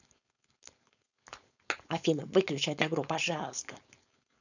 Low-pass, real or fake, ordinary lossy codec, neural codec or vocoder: 7.2 kHz; fake; none; codec, 16 kHz, 4.8 kbps, FACodec